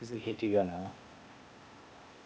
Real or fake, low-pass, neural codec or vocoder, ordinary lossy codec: fake; none; codec, 16 kHz, 0.8 kbps, ZipCodec; none